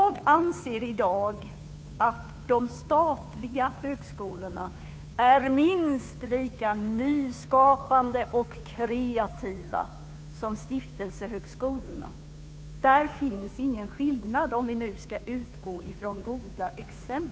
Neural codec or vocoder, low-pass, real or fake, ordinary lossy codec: codec, 16 kHz, 2 kbps, FunCodec, trained on Chinese and English, 25 frames a second; none; fake; none